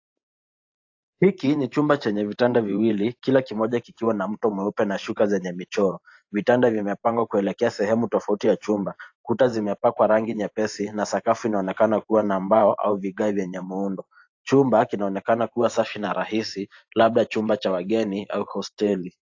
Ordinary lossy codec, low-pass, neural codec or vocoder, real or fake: AAC, 48 kbps; 7.2 kHz; vocoder, 44.1 kHz, 128 mel bands every 512 samples, BigVGAN v2; fake